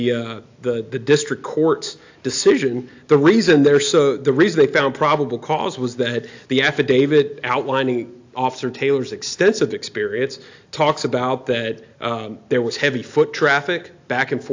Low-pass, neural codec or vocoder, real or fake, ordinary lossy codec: 7.2 kHz; none; real; AAC, 48 kbps